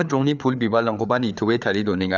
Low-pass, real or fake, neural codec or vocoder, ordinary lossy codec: 7.2 kHz; fake; codec, 16 kHz, 4 kbps, FreqCodec, larger model; none